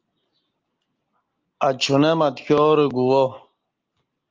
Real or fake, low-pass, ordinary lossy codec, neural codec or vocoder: real; 7.2 kHz; Opus, 24 kbps; none